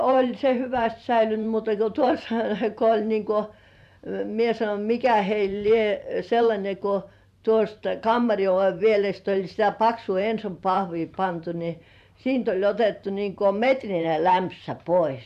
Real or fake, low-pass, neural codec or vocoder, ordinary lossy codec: fake; 14.4 kHz; vocoder, 44.1 kHz, 128 mel bands every 512 samples, BigVGAN v2; AAC, 96 kbps